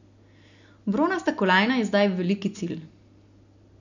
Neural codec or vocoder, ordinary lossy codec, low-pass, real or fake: none; none; 7.2 kHz; real